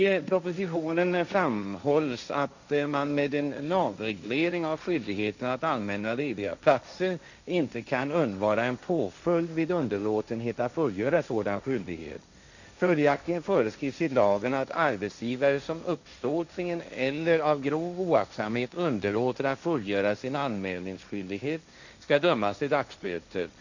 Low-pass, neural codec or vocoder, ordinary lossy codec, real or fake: 7.2 kHz; codec, 16 kHz, 1.1 kbps, Voila-Tokenizer; none; fake